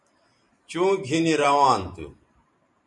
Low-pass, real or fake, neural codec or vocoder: 10.8 kHz; fake; vocoder, 44.1 kHz, 128 mel bands every 512 samples, BigVGAN v2